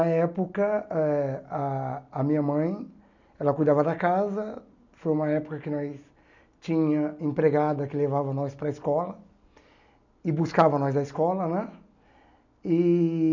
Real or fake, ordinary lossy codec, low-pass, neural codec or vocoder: real; none; 7.2 kHz; none